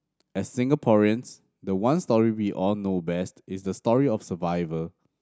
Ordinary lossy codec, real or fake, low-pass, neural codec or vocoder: none; real; none; none